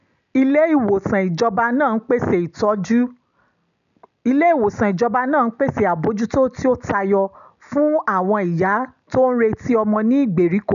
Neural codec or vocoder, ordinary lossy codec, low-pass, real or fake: none; none; 7.2 kHz; real